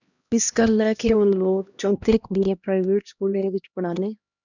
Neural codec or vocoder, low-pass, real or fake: codec, 16 kHz, 1 kbps, X-Codec, HuBERT features, trained on LibriSpeech; 7.2 kHz; fake